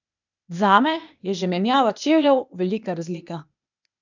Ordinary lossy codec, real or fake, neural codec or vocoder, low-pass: none; fake; codec, 16 kHz, 0.8 kbps, ZipCodec; 7.2 kHz